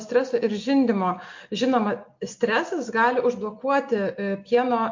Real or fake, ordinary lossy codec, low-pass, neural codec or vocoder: real; MP3, 48 kbps; 7.2 kHz; none